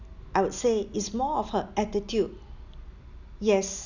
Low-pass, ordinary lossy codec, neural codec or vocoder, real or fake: 7.2 kHz; none; none; real